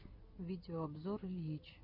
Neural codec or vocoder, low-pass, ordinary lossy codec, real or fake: none; 5.4 kHz; MP3, 32 kbps; real